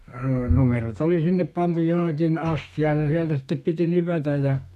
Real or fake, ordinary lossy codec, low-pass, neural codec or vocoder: fake; none; 14.4 kHz; codec, 32 kHz, 1.9 kbps, SNAC